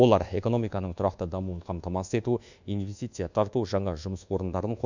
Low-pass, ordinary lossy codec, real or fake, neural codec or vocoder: 7.2 kHz; none; fake; codec, 24 kHz, 1.2 kbps, DualCodec